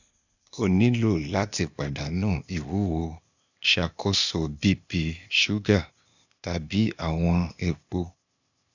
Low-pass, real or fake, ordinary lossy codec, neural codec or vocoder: 7.2 kHz; fake; none; codec, 16 kHz, 0.8 kbps, ZipCodec